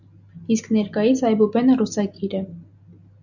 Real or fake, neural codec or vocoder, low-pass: real; none; 7.2 kHz